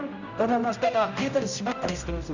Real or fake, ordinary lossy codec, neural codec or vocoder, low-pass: fake; none; codec, 16 kHz, 0.5 kbps, X-Codec, HuBERT features, trained on general audio; 7.2 kHz